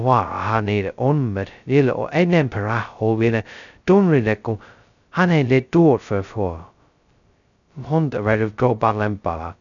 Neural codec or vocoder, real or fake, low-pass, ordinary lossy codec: codec, 16 kHz, 0.2 kbps, FocalCodec; fake; 7.2 kHz; none